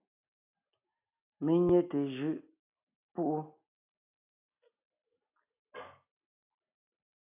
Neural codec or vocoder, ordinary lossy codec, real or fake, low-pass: none; AAC, 32 kbps; real; 3.6 kHz